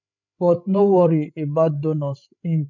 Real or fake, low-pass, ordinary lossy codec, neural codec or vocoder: fake; 7.2 kHz; none; codec, 16 kHz, 8 kbps, FreqCodec, larger model